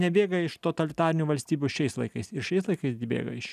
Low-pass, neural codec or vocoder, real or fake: 14.4 kHz; none; real